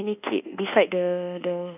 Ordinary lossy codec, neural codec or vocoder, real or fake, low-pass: none; codec, 24 kHz, 1.2 kbps, DualCodec; fake; 3.6 kHz